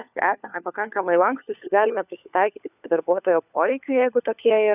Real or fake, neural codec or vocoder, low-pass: fake; codec, 16 kHz, 4 kbps, FunCodec, trained on LibriTTS, 50 frames a second; 3.6 kHz